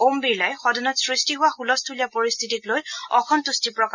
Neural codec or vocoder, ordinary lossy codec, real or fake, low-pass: none; none; real; 7.2 kHz